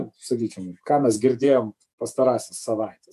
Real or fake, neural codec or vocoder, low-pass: fake; vocoder, 48 kHz, 128 mel bands, Vocos; 14.4 kHz